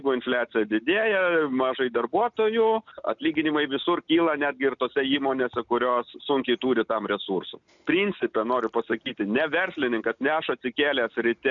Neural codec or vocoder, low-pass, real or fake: none; 7.2 kHz; real